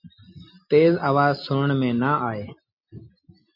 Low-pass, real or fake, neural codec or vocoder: 5.4 kHz; real; none